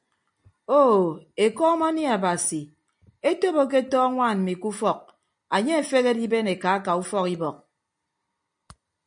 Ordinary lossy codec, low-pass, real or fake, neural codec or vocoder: MP3, 96 kbps; 9.9 kHz; real; none